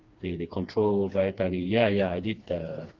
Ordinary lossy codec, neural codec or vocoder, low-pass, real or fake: Opus, 32 kbps; codec, 16 kHz, 2 kbps, FreqCodec, smaller model; 7.2 kHz; fake